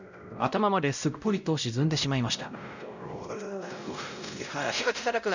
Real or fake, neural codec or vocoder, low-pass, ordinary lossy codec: fake; codec, 16 kHz, 0.5 kbps, X-Codec, WavLM features, trained on Multilingual LibriSpeech; 7.2 kHz; none